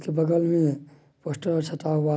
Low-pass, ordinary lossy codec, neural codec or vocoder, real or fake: none; none; none; real